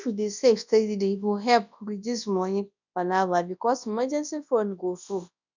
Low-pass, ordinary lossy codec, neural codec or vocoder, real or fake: 7.2 kHz; none; codec, 24 kHz, 0.9 kbps, WavTokenizer, large speech release; fake